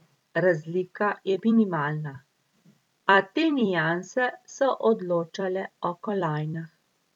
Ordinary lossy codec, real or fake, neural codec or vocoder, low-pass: none; fake; vocoder, 44.1 kHz, 128 mel bands every 256 samples, BigVGAN v2; 19.8 kHz